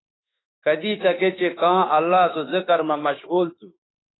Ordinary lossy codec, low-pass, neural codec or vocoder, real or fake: AAC, 16 kbps; 7.2 kHz; autoencoder, 48 kHz, 32 numbers a frame, DAC-VAE, trained on Japanese speech; fake